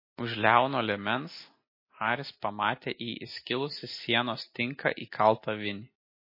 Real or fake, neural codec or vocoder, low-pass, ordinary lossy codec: real; none; 5.4 kHz; MP3, 24 kbps